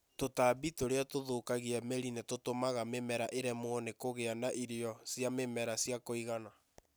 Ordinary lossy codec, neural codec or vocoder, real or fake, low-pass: none; none; real; none